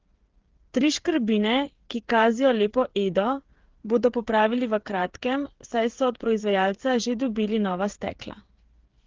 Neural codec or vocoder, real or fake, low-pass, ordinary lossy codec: codec, 16 kHz, 8 kbps, FreqCodec, smaller model; fake; 7.2 kHz; Opus, 16 kbps